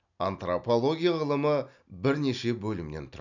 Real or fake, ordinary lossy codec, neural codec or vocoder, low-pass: real; none; none; 7.2 kHz